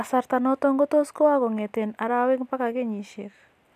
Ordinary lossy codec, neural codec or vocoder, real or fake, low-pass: none; none; real; 14.4 kHz